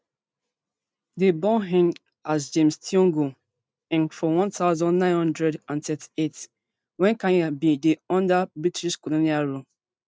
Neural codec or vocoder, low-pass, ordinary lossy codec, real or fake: none; none; none; real